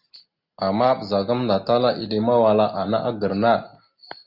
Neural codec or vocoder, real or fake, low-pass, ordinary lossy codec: none; real; 5.4 kHz; Opus, 64 kbps